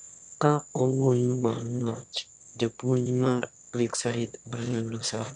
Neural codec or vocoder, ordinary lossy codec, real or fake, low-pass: autoencoder, 22.05 kHz, a latent of 192 numbers a frame, VITS, trained on one speaker; none; fake; 9.9 kHz